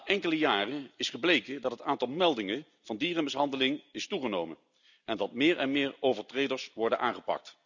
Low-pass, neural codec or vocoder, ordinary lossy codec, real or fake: 7.2 kHz; none; none; real